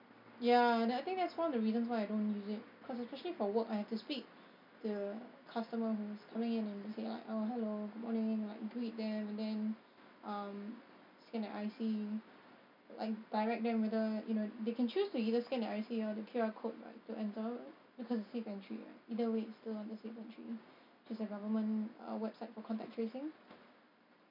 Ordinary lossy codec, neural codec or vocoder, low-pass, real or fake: none; none; 5.4 kHz; real